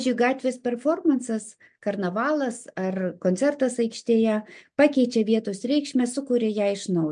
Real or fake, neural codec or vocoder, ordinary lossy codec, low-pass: real; none; MP3, 64 kbps; 9.9 kHz